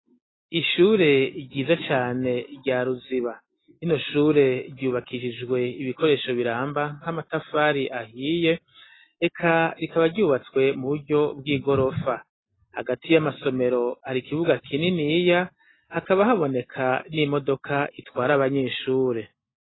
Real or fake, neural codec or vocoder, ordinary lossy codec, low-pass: real; none; AAC, 16 kbps; 7.2 kHz